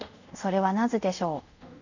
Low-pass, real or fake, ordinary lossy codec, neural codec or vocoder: 7.2 kHz; fake; none; codec, 24 kHz, 0.5 kbps, DualCodec